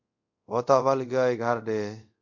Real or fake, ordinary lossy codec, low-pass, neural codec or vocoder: fake; MP3, 48 kbps; 7.2 kHz; codec, 24 kHz, 0.5 kbps, DualCodec